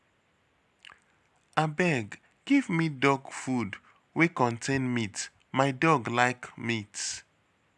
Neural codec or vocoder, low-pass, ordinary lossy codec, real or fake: none; none; none; real